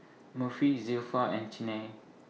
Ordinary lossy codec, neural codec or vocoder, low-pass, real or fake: none; none; none; real